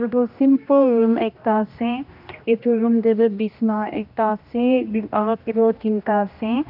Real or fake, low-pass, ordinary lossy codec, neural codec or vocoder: fake; 5.4 kHz; none; codec, 16 kHz, 1 kbps, X-Codec, HuBERT features, trained on general audio